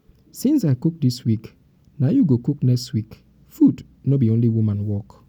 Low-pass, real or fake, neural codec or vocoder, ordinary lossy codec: 19.8 kHz; real; none; none